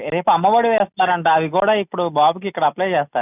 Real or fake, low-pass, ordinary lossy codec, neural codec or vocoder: real; 3.6 kHz; none; none